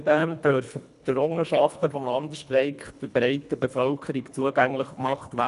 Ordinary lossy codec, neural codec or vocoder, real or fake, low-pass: none; codec, 24 kHz, 1.5 kbps, HILCodec; fake; 10.8 kHz